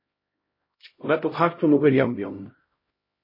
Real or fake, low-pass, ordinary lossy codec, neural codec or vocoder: fake; 5.4 kHz; MP3, 24 kbps; codec, 16 kHz, 0.5 kbps, X-Codec, HuBERT features, trained on LibriSpeech